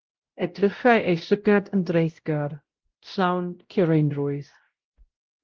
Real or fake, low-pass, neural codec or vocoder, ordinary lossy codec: fake; 7.2 kHz; codec, 16 kHz, 0.5 kbps, X-Codec, WavLM features, trained on Multilingual LibriSpeech; Opus, 16 kbps